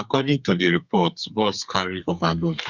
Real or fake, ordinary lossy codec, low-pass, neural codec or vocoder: fake; Opus, 64 kbps; 7.2 kHz; codec, 32 kHz, 1.9 kbps, SNAC